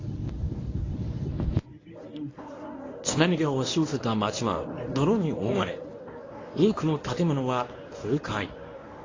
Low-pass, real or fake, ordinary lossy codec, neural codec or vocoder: 7.2 kHz; fake; AAC, 32 kbps; codec, 24 kHz, 0.9 kbps, WavTokenizer, medium speech release version 1